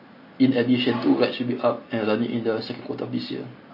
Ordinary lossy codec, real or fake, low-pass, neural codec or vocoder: MP3, 24 kbps; real; 5.4 kHz; none